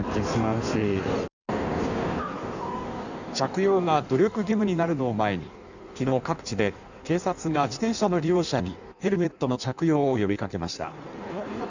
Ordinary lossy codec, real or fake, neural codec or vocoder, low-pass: none; fake; codec, 16 kHz in and 24 kHz out, 1.1 kbps, FireRedTTS-2 codec; 7.2 kHz